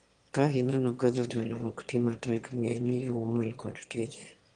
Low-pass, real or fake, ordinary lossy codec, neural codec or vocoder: 9.9 kHz; fake; Opus, 24 kbps; autoencoder, 22.05 kHz, a latent of 192 numbers a frame, VITS, trained on one speaker